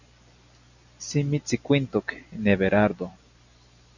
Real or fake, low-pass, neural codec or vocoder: real; 7.2 kHz; none